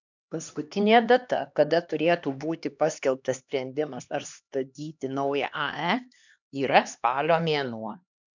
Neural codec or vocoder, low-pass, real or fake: codec, 16 kHz, 2 kbps, X-Codec, HuBERT features, trained on LibriSpeech; 7.2 kHz; fake